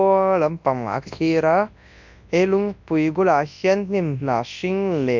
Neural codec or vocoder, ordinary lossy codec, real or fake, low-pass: codec, 24 kHz, 0.9 kbps, WavTokenizer, large speech release; MP3, 64 kbps; fake; 7.2 kHz